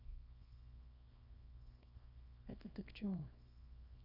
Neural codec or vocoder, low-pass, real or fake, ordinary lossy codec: codec, 24 kHz, 0.9 kbps, WavTokenizer, small release; 5.4 kHz; fake; none